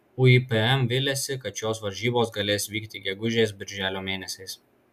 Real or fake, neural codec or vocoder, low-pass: real; none; 14.4 kHz